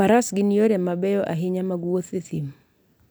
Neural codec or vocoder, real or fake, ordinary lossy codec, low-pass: none; real; none; none